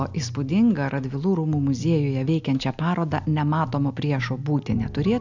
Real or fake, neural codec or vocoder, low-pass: real; none; 7.2 kHz